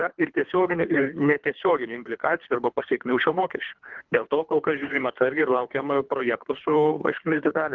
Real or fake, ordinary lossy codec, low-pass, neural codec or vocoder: fake; Opus, 32 kbps; 7.2 kHz; codec, 24 kHz, 3 kbps, HILCodec